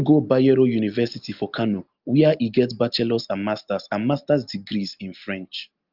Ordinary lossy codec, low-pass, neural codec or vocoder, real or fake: Opus, 32 kbps; 5.4 kHz; none; real